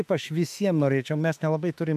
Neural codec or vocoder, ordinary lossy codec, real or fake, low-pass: autoencoder, 48 kHz, 32 numbers a frame, DAC-VAE, trained on Japanese speech; MP3, 96 kbps; fake; 14.4 kHz